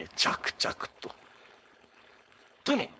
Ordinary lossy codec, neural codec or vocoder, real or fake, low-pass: none; codec, 16 kHz, 4.8 kbps, FACodec; fake; none